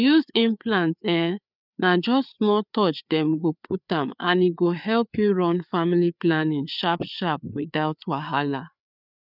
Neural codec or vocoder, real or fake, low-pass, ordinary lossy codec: codec, 16 kHz, 4 kbps, FreqCodec, larger model; fake; 5.4 kHz; none